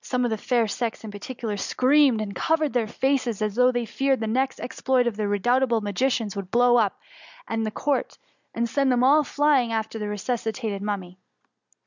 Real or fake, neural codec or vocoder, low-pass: real; none; 7.2 kHz